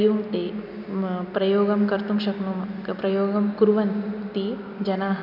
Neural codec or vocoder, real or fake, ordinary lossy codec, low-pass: none; real; none; 5.4 kHz